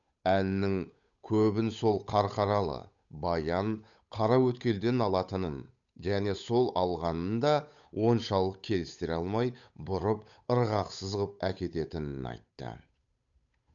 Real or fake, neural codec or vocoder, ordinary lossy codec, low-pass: fake; codec, 16 kHz, 8 kbps, FunCodec, trained on Chinese and English, 25 frames a second; MP3, 96 kbps; 7.2 kHz